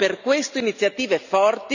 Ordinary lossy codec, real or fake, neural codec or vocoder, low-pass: none; real; none; 7.2 kHz